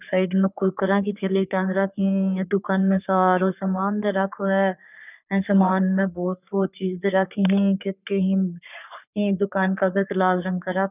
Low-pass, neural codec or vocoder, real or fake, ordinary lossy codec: 3.6 kHz; codec, 44.1 kHz, 3.4 kbps, Pupu-Codec; fake; none